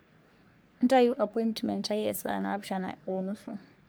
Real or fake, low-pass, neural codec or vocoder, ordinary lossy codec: fake; none; codec, 44.1 kHz, 3.4 kbps, Pupu-Codec; none